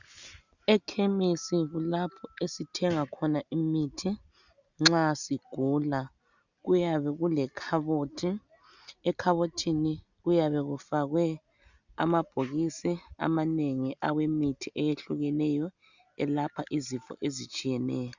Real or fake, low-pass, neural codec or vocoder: real; 7.2 kHz; none